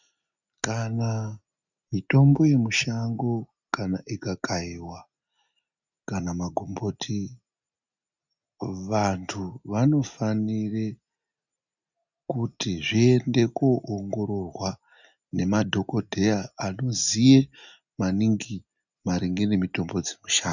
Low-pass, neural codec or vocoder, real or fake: 7.2 kHz; none; real